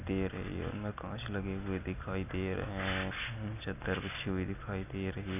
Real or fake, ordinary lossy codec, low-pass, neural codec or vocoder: real; none; 3.6 kHz; none